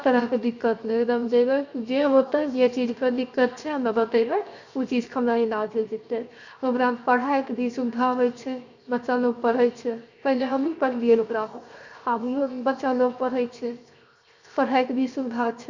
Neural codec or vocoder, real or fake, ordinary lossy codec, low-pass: codec, 16 kHz, 0.7 kbps, FocalCodec; fake; Opus, 64 kbps; 7.2 kHz